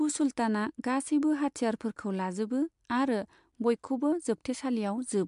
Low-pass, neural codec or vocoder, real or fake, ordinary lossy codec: 10.8 kHz; none; real; MP3, 64 kbps